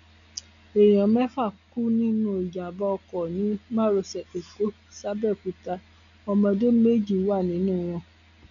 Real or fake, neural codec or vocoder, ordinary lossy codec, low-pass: real; none; none; 7.2 kHz